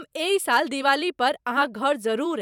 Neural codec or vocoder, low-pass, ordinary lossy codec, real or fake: vocoder, 44.1 kHz, 128 mel bands every 512 samples, BigVGAN v2; 19.8 kHz; none; fake